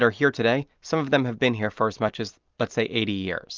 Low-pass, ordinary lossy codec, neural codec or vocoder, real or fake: 7.2 kHz; Opus, 32 kbps; none; real